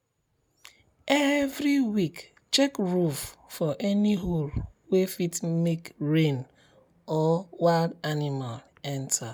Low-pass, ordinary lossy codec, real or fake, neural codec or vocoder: none; none; real; none